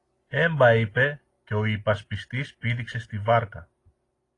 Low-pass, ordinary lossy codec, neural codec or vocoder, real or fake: 10.8 kHz; AAC, 32 kbps; none; real